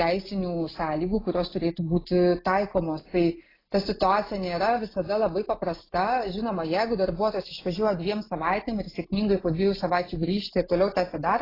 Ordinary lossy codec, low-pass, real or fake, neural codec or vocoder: AAC, 24 kbps; 5.4 kHz; real; none